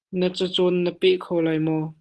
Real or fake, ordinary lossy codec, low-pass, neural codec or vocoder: real; Opus, 16 kbps; 10.8 kHz; none